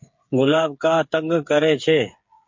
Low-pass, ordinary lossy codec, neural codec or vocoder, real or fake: 7.2 kHz; MP3, 48 kbps; codec, 16 kHz, 8 kbps, FreqCodec, smaller model; fake